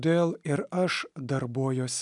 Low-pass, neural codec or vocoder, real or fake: 10.8 kHz; none; real